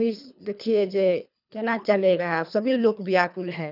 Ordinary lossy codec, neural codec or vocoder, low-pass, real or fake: none; codec, 24 kHz, 3 kbps, HILCodec; 5.4 kHz; fake